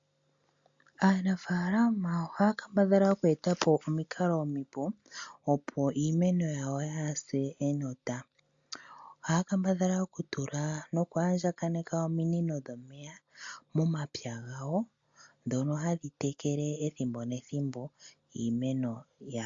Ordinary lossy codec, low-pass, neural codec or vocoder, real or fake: MP3, 48 kbps; 7.2 kHz; none; real